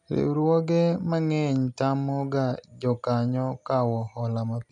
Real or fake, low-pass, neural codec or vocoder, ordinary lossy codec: real; 10.8 kHz; none; none